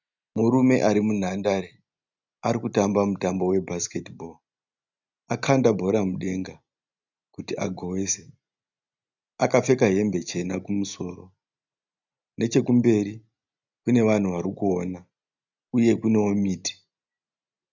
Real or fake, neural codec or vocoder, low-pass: real; none; 7.2 kHz